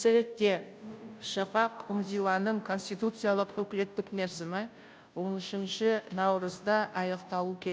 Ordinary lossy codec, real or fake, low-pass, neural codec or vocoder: none; fake; none; codec, 16 kHz, 0.5 kbps, FunCodec, trained on Chinese and English, 25 frames a second